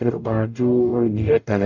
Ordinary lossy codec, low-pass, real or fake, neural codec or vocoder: none; 7.2 kHz; fake; codec, 44.1 kHz, 0.9 kbps, DAC